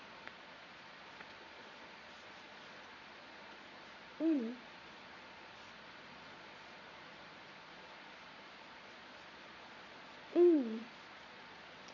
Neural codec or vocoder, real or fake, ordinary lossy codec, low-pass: vocoder, 22.05 kHz, 80 mel bands, Vocos; fake; none; 7.2 kHz